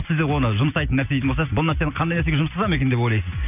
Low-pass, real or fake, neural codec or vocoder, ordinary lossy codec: 3.6 kHz; real; none; none